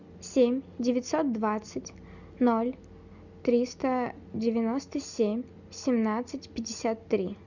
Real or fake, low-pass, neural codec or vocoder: real; 7.2 kHz; none